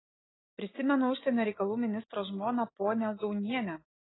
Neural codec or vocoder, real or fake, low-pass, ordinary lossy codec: none; real; 7.2 kHz; AAC, 16 kbps